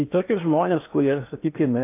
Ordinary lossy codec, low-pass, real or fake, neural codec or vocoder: AAC, 24 kbps; 3.6 kHz; fake; codec, 16 kHz in and 24 kHz out, 0.8 kbps, FocalCodec, streaming, 65536 codes